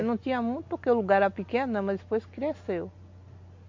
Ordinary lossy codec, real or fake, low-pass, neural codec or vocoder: MP3, 48 kbps; real; 7.2 kHz; none